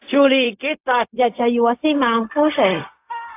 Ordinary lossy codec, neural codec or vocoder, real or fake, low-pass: AAC, 32 kbps; codec, 16 kHz, 0.4 kbps, LongCat-Audio-Codec; fake; 3.6 kHz